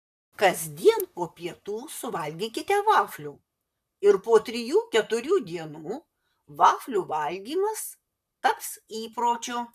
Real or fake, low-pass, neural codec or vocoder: fake; 14.4 kHz; vocoder, 44.1 kHz, 128 mel bands, Pupu-Vocoder